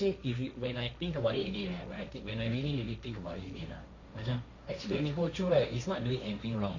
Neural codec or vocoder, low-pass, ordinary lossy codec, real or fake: codec, 16 kHz, 1.1 kbps, Voila-Tokenizer; 7.2 kHz; none; fake